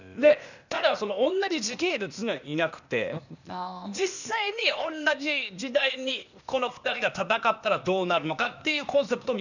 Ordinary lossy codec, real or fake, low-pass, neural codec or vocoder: none; fake; 7.2 kHz; codec, 16 kHz, 0.8 kbps, ZipCodec